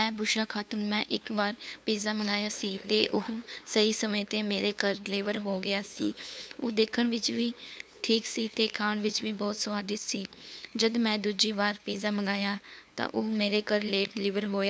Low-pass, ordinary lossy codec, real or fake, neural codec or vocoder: none; none; fake; codec, 16 kHz, 2 kbps, FunCodec, trained on LibriTTS, 25 frames a second